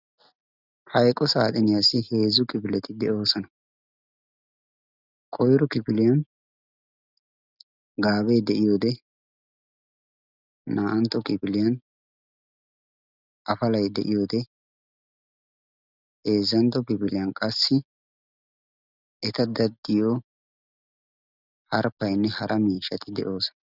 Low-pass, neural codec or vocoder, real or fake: 5.4 kHz; none; real